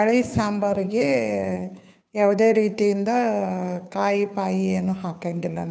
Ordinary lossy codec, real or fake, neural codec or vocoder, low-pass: none; fake; codec, 16 kHz, 2 kbps, FunCodec, trained on Chinese and English, 25 frames a second; none